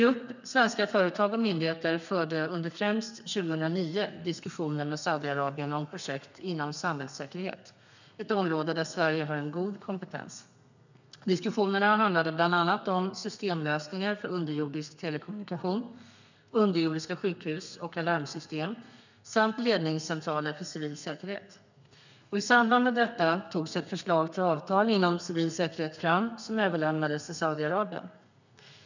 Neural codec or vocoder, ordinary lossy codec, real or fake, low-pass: codec, 32 kHz, 1.9 kbps, SNAC; none; fake; 7.2 kHz